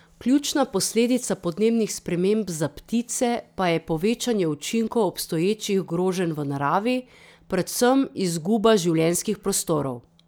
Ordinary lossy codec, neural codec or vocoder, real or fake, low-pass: none; none; real; none